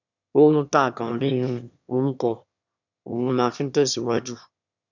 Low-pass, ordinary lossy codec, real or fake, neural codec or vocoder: 7.2 kHz; none; fake; autoencoder, 22.05 kHz, a latent of 192 numbers a frame, VITS, trained on one speaker